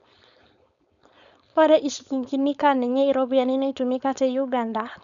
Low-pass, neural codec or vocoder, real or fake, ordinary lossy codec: 7.2 kHz; codec, 16 kHz, 4.8 kbps, FACodec; fake; none